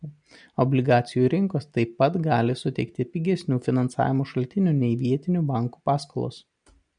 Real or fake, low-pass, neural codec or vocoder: real; 9.9 kHz; none